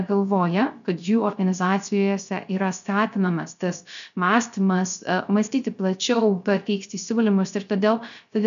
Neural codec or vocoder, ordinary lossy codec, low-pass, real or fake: codec, 16 kHz, 0.3 kbps, FocalCodec; MP3, 96 kbps; 7.2 kHz; fake